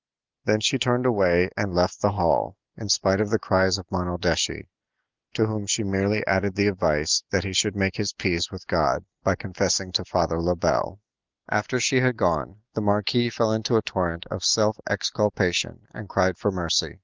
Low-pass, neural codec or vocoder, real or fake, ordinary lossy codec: 7.2 kHz; none; real; Opus, 32 kbps